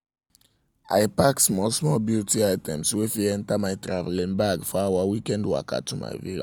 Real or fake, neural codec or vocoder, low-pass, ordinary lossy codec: real; none; none; none